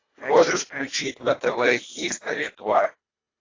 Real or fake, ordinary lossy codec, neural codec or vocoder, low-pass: fake; AAC, 32 kbps; codec, 24 kHz, 1.5 kbps, HILCodec; 7.2 kHz